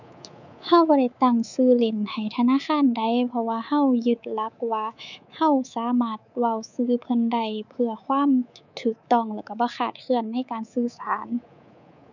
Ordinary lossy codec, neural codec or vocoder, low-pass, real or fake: none; codec, 24 kHz, 3.1 kbps, DualCodec; 7.2 kHz; fake